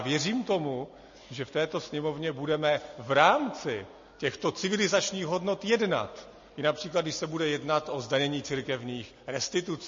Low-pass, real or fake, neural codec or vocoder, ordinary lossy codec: 7.2 kHz; real; none; MP3, 32 kbps